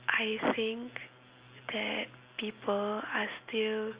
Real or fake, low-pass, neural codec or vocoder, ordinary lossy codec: real; 3.6 kHz; none; Opus, 64 kbps